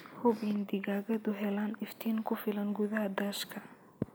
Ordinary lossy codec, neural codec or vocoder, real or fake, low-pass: none; none; real; none